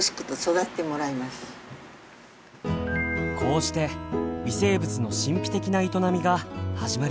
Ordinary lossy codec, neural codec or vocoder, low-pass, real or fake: none; none; none; real